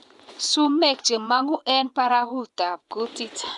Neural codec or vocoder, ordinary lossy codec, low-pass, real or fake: vocoder, 24 kHz, 100 mel bands, Vocos; none; 10.8 kHz; fake